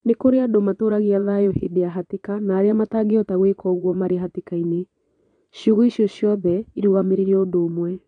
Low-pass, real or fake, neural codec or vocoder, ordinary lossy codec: 9.9 kHz; fake; vocoder, 22.05 kHz, 80 mel bands, Vocos; MP3, 96 kbps